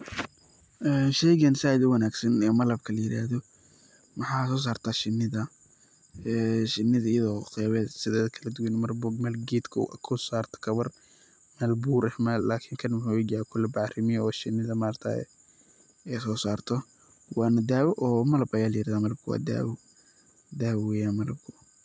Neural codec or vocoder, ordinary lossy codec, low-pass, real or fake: none; none; none; real